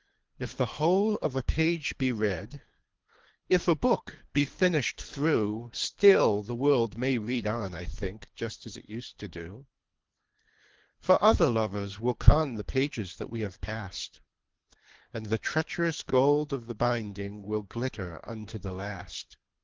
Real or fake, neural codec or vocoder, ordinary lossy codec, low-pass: fake; codec, 24 kHz, 3 kbps, HILCodec; Opus, 16 kbps; 7.2 kHz